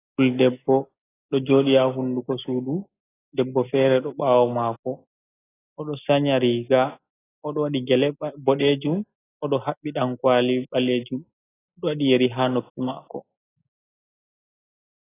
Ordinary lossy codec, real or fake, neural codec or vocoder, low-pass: AAC, 16 kbps; real; none; 3.6 kHz